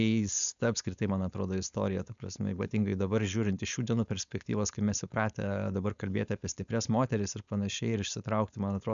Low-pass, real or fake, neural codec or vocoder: 7.2 kHz; fake; codec, 16 kHz, 4.8 kbps, FACodec